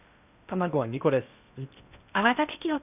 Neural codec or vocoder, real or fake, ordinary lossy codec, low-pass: codec, 16 kHz in and 24 kHz out, 0.6 kbps, FocalCodec, streaming, 2048 codes; fake; none; 3.6 kHz